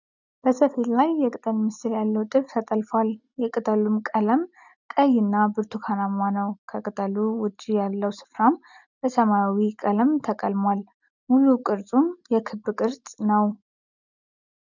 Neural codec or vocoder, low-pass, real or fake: none; 7.2 kHz; real